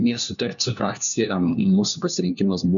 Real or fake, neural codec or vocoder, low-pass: fake; codec, 16 kHz, 1 kbps, FunCodec, trained on LibriTTS, 50 frames a second; 7.2 kHz